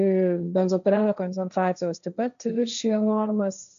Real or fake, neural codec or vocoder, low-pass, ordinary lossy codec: fake; codec, 16 kHz, 1.1 kbps, Voila-Tokenizer; 7.2 kHz; MP3, 96 kbps